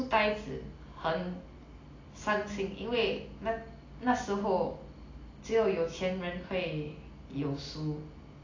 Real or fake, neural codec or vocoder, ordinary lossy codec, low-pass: real; none; AAC, 32 kbps; 7.2 kHz